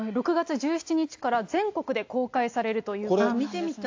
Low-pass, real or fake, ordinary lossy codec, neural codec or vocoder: 7.2 kHz; real; AAC, 48 kbps; none